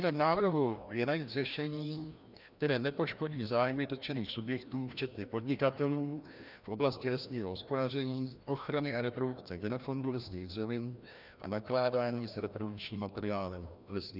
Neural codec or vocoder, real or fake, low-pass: codec, 16 kHz, 1 kbps, FreqCodec, larger model; fake; 5.4 kHz